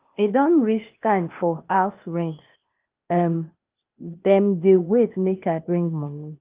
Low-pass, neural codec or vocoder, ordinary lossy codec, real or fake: 3.6 kHz; codec, 16 kHz, 0.8 kbps, ZipCodec; Opus, 32 kbps; fake